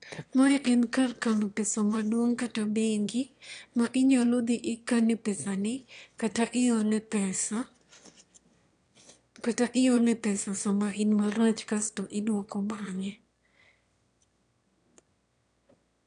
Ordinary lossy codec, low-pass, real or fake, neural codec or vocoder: none; 9.9 kHz; fake; autoencoder, 22.05 kHz, a latent of 192 numbers a frame, VITS, trained on one speaker